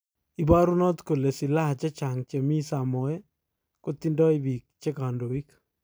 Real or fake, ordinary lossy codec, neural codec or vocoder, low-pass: real; none; none; none